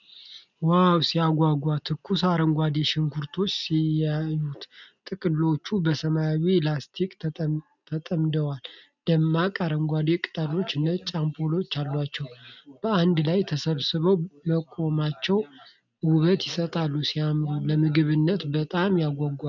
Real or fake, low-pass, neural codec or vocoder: real; 7.2 kHz; none